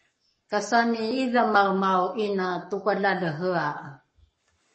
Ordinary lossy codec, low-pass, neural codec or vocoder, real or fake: MP3, 32 kbps; 10.8 kHz; codec, 44.1 kHz, 7.8 kbps, Pupu-Codec; fake